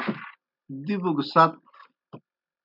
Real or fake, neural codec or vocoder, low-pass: real; none; 5.4 kHz